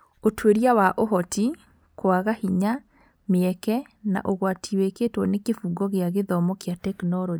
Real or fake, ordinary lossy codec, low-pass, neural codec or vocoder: real; none; none; none